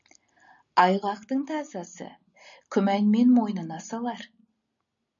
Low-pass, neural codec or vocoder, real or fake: 7.2 kHz; none; real